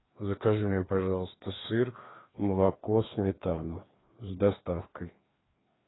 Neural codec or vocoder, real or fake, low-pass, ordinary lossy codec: codec, 16 kHz, 2 kbps, FreqCodec, larger model; fake; 7.2 kHz; AAC, 16 kbps